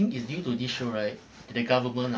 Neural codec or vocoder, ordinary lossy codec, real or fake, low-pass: none; none; real; none